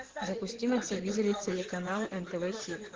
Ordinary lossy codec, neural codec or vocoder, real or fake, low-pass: Opus, 16 kbps; codec, 16 kHz in and 24 kHz out, 2.2 kbps, FireRedTTS-2 codec; fake; 7.2 kHz